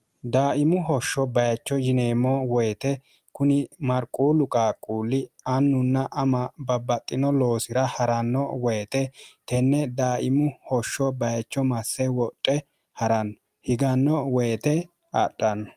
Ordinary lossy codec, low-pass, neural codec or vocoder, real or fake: Opus, 32 kbps; 14.4 kHz; none; real